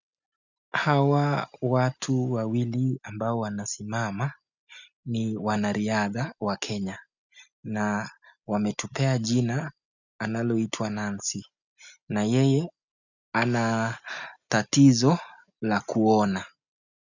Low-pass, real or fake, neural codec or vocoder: 7.2 kHz; real; none